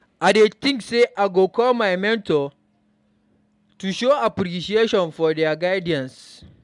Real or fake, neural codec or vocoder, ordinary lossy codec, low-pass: real; none; MP3, 96 kbps; 10.8 kHz